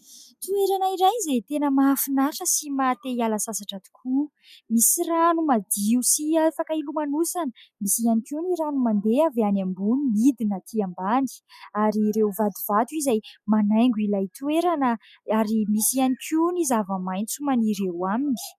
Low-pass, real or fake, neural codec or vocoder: 14.4 kHz; real; none